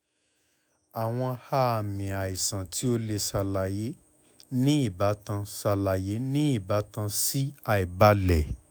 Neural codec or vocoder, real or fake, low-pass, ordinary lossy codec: autoencoder, 48 kHz, 128 numbers a frame, DAC-VAE, trained on Japanese speech; fake; none; none